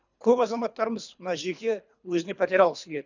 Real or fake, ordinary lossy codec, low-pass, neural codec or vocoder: fake; none; 7.2 kHz; codec, 24 kHz, 3 kbps, HILCodec